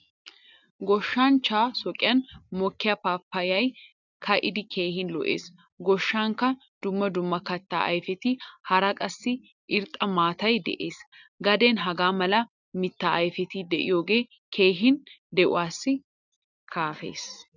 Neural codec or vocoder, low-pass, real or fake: none; 7.2 kHz; real